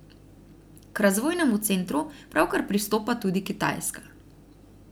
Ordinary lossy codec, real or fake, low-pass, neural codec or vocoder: none; real; none; none